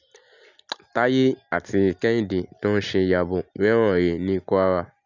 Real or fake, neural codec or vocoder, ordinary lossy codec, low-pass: real; none; none; 7.2 kHz